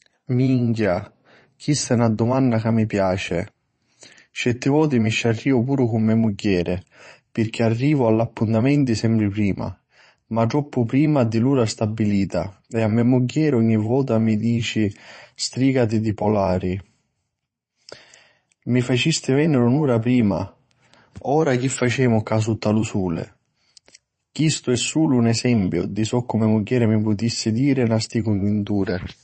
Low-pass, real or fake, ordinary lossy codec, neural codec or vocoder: 9.9 kHz; fake; MP3, 32 kbps; vocoder, 22.05 kHz, 80 mel bands, Vocos